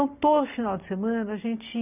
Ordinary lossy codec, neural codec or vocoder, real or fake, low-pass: none; none; real; 3.6 kHz